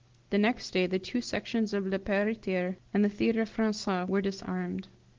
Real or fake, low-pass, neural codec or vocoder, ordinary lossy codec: real; 7.2 kHz; none; Opus, 16 kbps